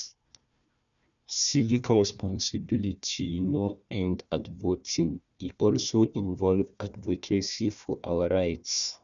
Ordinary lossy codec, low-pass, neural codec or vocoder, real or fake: none; 7.2 kHz; codec, 16 kHz, 1 kbps, FunCodec, trained on Chinese and English, 50 frames a second; fake